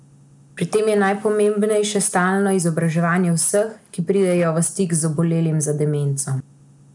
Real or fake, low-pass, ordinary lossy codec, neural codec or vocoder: real; 10.8 kHz; MP3, 96 kbps; none